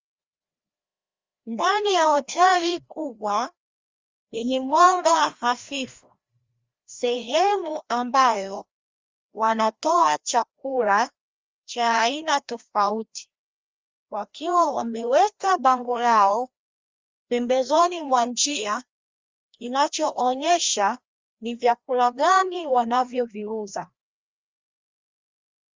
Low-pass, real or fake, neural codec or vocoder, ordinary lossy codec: 7.2 kHz; fake; codec, 16 kHz, 1 kbps, FreqCodec, larger model; Opus, 32 kbps